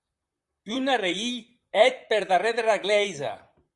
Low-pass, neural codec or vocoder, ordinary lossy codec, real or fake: 10.8 kHz; vocoder, 44.1 kHz, 128 mel bands, Pupu-Vocoder; Opus, 64 kbps; fake